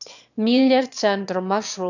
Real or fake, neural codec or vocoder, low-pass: fake; autoencoder, 22.05 kHz, a latent of 192 numbers a frame, VITS, trained on one speaker; 7.2 kHz